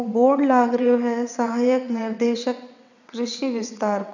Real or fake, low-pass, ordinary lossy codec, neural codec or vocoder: fake; 7.2 kHz; none; vocoder, 22.05 kHz, 80 mel bands, WaveNeXt